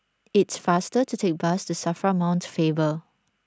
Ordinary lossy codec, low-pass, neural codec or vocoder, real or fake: none; none; none; real